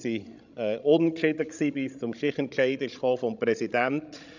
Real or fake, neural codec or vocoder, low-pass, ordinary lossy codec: fake; codec, 16 kHz, 16 kbps, FreqCodec, larger model; 7.2 kHz; none